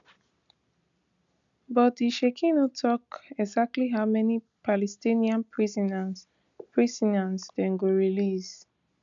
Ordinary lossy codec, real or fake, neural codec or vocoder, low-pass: none; real; none; 7.2 kHz